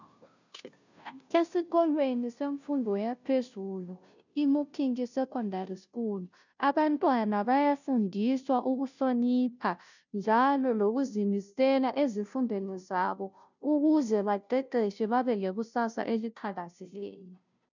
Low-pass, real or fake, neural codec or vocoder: 7.2 kHz; fake; codec, 16 kHz, 0.5 kbps, FunCodec, trained on Chinese and English, 25 frames a second